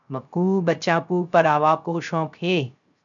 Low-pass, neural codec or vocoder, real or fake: 7.2 kHz; codec, 16 kHz, 0.3 kbps, FocalCodec; fake